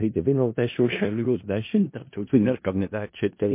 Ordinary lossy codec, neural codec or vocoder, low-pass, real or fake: MP3, 24 kbps; codec, 16 kHz in and 24 kHz out, 0.4 kbps, LongCat-Audio-Codec, four codebook decoder; 3.6 kHz; fake